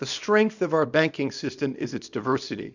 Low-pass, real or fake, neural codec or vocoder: 7.2 kHz; fake; codec, 24 kHz, 0.9 kbps, WavTokenizer, small release